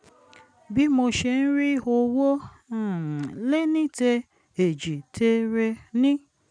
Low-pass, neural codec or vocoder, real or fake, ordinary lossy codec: 9.9 kHz; none; real; none